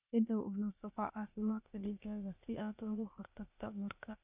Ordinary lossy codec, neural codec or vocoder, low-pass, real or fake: none; codec, 16 kHz, 0.8 kbps, ZipCodec; 3.6 kHz; fake